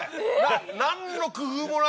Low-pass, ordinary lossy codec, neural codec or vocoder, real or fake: none; none; none; real